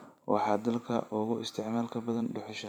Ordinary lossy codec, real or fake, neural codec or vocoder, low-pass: none; fake; vocoder, 44.1 kHz, 128 mel bands every 512 samples, BigVGAN v2; 19.8 kHz